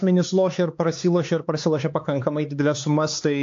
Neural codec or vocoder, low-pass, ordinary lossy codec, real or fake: codec, 16 kHz, 4 kbps, X-Codec, HuBERT features, trained on LibriSpeech; 7.2 kHz; AAC, 48 kbps; fake